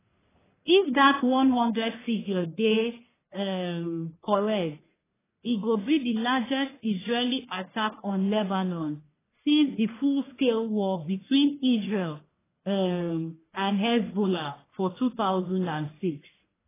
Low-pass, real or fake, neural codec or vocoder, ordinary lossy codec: 3.6 kHz; fake; codec, 44.1 kHz, 1.7 kbps, Pupu-Codec; AAC, 16 kbps